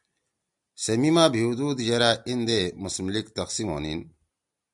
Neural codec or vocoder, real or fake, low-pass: none; real; 10.8 kHz